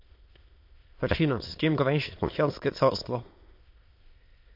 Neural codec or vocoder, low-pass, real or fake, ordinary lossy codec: autoencoder, 22.05 kHz, a latent of 192 numbers a frame, VITS, trained on many speakers; 5.4 kHz; fake; MP3, 32 kbps